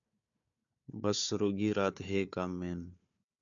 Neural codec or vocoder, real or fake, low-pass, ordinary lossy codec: codec, 16 kHz, 4 kbps, FunCodec, trained on Chinese and English, 50 frames a second; fake; 7.2 kHz; AAC, 64 kbps